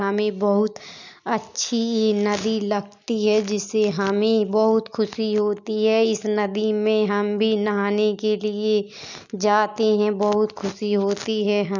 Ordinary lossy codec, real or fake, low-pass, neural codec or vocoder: none; real; 7.2 kHz; none